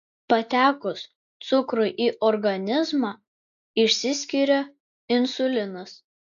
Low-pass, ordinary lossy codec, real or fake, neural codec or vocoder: 7.2 kHz; AAC, 96 kbps; real; none